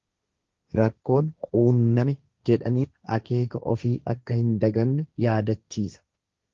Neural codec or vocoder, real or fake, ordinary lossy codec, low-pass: codec, 16 kHz, 1.1 kbps, Voila-Tokenizer; fake; Opus, 32 kbps; 7.2 kHz